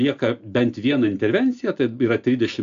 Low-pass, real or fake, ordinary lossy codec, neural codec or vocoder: 7.2 kHz; real; AAC, 64 kbps; none